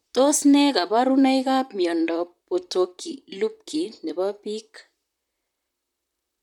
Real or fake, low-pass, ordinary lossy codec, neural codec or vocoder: fake; 19.8 kHz; none; vocoder, 44.1 kHz, 128 mel bands, Pupu-Vocoder